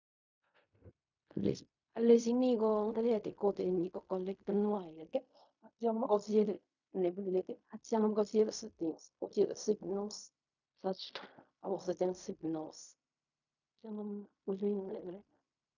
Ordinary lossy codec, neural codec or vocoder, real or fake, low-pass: none; codec, 16 kHz in and 24 kHz out, 0.4 kbps, LongCat-Audio-Codec, fine tuned four codebook decoder; fake; 7.2 kHz